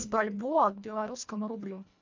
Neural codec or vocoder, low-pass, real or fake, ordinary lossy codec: codec, 24 kHz, 1.5 kbps, HILCodec; 7.2 kHz; fake; MP3, 64 kbps